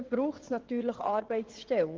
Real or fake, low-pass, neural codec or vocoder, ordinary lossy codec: fake; 7.2 kHz; vocoder, 22.05 kHz, 80 mel bands, WaveNeXt; Opus, 16 kbps